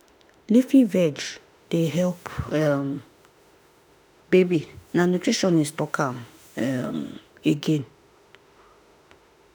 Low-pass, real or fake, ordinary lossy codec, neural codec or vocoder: none; fake; none; autoencoder, 48 kHz, 32 numbers a frame, DAC-VAE, trained on Japanese speech